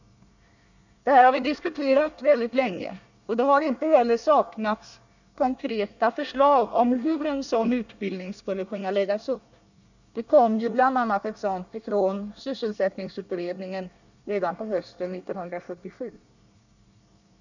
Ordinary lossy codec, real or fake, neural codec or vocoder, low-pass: none; fake; codec, 24 kHz, 1 kbps, SNAC; 7.2 kHz